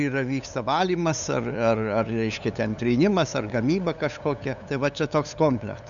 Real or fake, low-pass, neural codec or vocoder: fake; 7.2 kHz; codec, 16 kHz, 8 kbps, FunCodec, trained on LibriTTS, 25 frames a second